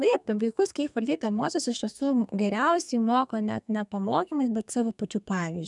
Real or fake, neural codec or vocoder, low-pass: fake; codec, 32 kHz, 1.9 kbps, SNAC; 10.8 kHz